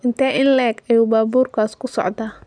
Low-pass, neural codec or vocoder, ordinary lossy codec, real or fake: 9.9 kHz; none; none; real